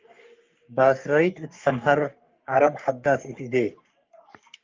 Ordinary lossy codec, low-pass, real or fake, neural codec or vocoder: Opus, 24 kbps; 7.2 kHz; fake; codec, 44.1 kHz, 3.4 kbps, Pupu-Codec